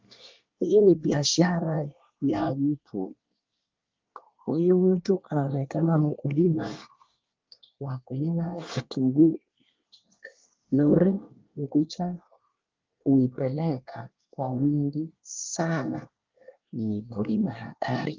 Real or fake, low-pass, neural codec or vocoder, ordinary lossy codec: fake; 7.2 kHz; codec, 24 kHz, 1 kbps, SNAC; Opus, 24 kbps